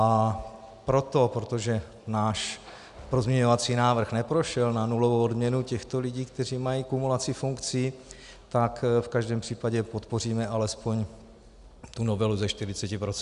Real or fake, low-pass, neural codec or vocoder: real; 10.8 kHz; none